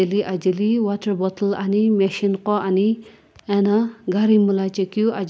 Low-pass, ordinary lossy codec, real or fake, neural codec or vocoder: none; none; real; none